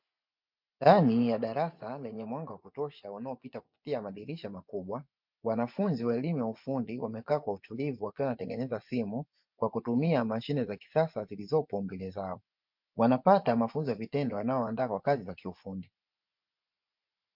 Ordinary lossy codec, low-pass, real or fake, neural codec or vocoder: MP3, 48 kbps; 5.4 kHz; fake; vocoder, 24 kHz, 100 mel bands, Vocos